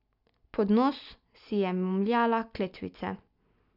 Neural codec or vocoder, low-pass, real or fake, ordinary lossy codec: none; 5.4 kHz; real; none